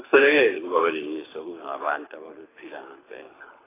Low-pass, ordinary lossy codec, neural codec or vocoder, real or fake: 3.6 kHz; AAC, 16 kbps; codec, 24 kHz, 6 kbps, HILCodec; fake